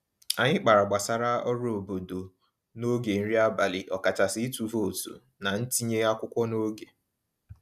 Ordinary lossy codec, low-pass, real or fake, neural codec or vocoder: none; 14.4 kHz; real; none